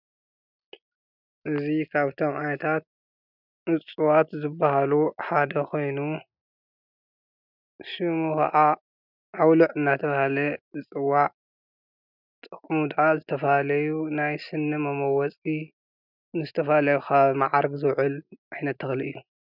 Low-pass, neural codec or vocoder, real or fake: 5.4 kHz; none; real